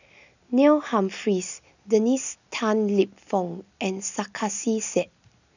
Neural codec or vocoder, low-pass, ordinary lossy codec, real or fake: none; 7.2 kHz; none; real